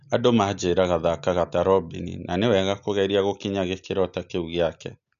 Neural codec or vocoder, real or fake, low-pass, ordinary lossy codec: none; real; 7.2 kHz; none